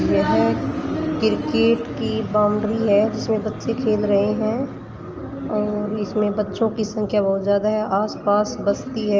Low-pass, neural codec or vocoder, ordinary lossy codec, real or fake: 7.2 kHz; none; Opus, 24 kbps; real